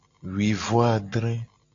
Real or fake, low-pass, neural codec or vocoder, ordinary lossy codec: real; 7.2 kHz; none; AAC, 48 kbps